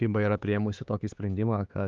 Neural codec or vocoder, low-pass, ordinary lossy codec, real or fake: codec, 16 kHz, 4 kbps, X-Codec, HuBERT features, trained on LibriSpeech; 7.2 kHz; Opus, 32 kbps; fake